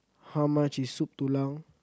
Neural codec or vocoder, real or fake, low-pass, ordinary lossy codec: none; real; none; none